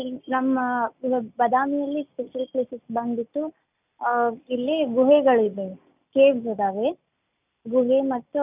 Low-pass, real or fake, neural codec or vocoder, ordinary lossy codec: 3.6 kHz; real; none; none